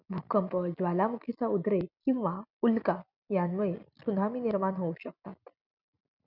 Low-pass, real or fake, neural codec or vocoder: 5.4 kHz; real; none